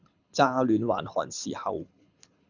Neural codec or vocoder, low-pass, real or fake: codec, 24 kHz, 6 kbps, HILCodec; 7.2 kHz; fake